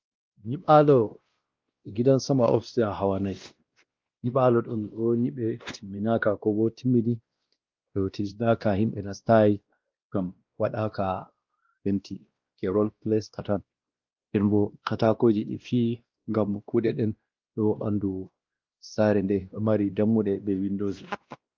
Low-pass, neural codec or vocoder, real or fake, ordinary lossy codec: 7.2 kHz; codec, 16 kHz, 1 kbps, X-Codec, WavLM features, trained on Multilingual LibriSpeech; fake; Opus, 32 kbps